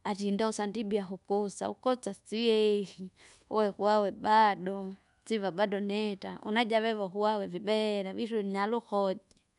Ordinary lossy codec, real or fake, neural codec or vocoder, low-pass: none; fake; codec, 24 kHz, 1.2 kbps, DualCodec; 10.8 kHz